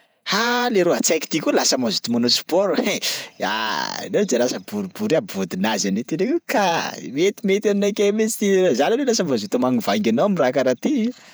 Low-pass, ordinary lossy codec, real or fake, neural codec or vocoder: none; none; fake; vocoder, 48 kHz, 128 mel bands, Vocos